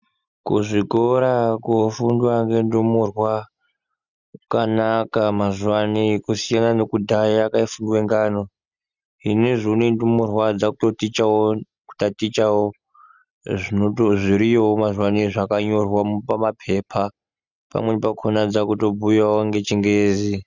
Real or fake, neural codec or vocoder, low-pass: real; none; 7.2 kHz